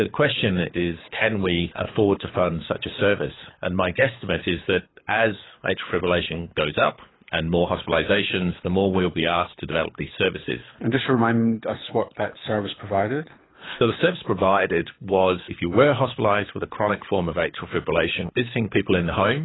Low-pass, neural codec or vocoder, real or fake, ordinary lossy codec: 7.2 kHz; codec, 24 kHz, 6 kbps, HILCodec; fake; AAC, 16 kbps